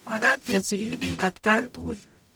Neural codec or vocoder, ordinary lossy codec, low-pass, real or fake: codec, 44.1 kHz, 0.9 kbps, DAC; none; none; fake